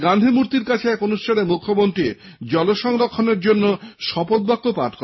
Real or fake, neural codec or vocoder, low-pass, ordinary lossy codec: real; none; 7.2 kHz; MP3, 24 kbps